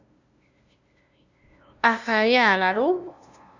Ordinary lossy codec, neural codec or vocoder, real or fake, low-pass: Opus, 64 kbps; codec, 16 kHz, 0.5 kbps, FunCodec, trained on LibriTTS, 25 frames a second; fake; 7.2 kHz